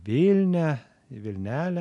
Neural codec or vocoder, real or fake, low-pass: none; real; 10.8 kHz